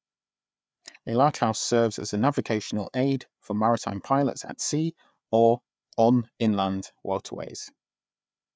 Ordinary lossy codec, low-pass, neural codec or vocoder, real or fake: none; none; codec, 16 kHz, 4 kbps, FreqCodec, larger model; fake